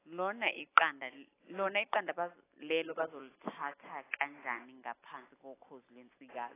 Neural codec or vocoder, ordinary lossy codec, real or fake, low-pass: none; AAC, 16 kbps; real; 3.6 kHz